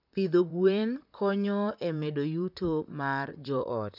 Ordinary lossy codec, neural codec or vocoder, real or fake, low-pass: none; vocoder, 44.1 kHz, 128 mel bands, Pupu-Vocoder; fake; 5.4 kHz